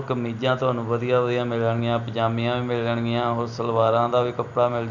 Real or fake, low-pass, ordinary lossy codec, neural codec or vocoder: real; 7.2 kHz; none; none